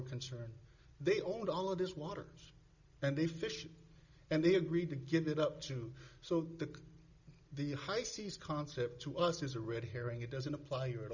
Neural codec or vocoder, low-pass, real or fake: none; 7.2 kHz; real